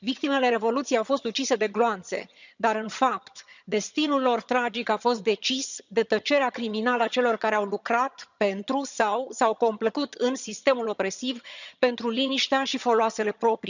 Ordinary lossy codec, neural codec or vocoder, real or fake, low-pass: none; vocoder, 22.05 kHz, 80 mel bands, HiFi-GAN; fake; 7.2 kHz